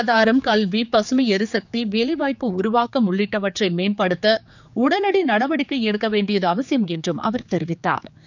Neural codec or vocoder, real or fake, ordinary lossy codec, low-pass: codec, 16 kHz, 4 kbps, X-Codec, HuBERT features, trained on general audio; fake; none; 7.2 kHz